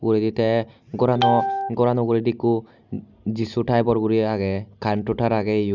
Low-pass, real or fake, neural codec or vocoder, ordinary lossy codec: 7.2 kHz; real; none; none